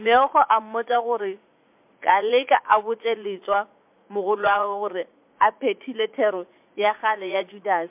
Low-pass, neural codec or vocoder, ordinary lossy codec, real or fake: 3.6 kHz; vocoder, 44.1 kHz, 80 mel bands, Vocos; MP3, 32 kbps; fake